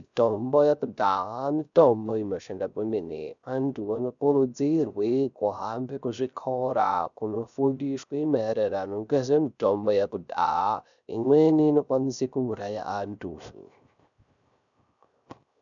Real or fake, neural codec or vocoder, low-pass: fake; codec, 16 kHz, 0.3 kbps, FocalCodec; 7.2 kHz